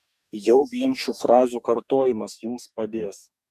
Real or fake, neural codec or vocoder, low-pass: fake; codec, 44.1 kHz, 2.6 kbps, DAC; 14.4 kHz